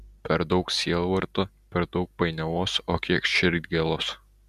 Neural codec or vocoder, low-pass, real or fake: none; 14.4 kHz; real